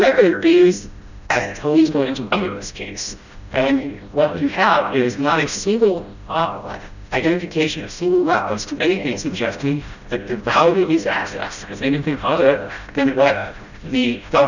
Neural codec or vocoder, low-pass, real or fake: codec, 16 kHz, 0.5 kbps, FreqCodec, smaller model; 7.2 kHz; fake